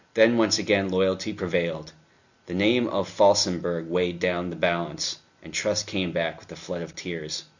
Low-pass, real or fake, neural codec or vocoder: 7.2 kHz; real; none